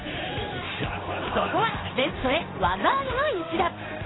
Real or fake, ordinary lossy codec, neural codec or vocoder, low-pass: fake; AAC, 16 kbps; codec, 16 kHz in and 24 kHz out, 1 kbps, XY-Tokenizer; 7.2 kHz